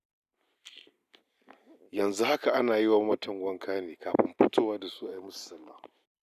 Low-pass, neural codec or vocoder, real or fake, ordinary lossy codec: 14.4 kHz; none; real; none